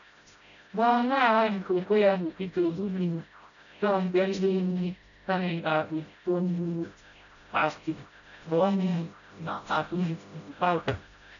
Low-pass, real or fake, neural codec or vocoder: 7.2 kHz; fake; codec, 16 kHz, 0.5 kbps, FreqCodec, smaller model